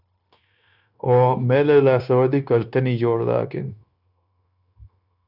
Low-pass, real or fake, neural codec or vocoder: 5.4 kHz; fake; codec, 16 kHz, 0.9 kbps, LongCat-Audio-Codec